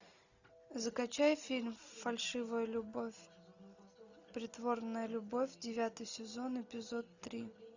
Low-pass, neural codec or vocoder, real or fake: 7.2 kHz; none; real